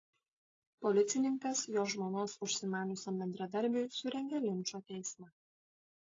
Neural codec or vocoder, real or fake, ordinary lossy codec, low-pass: none; real; AAC, 32 kbps; 7.2 kHz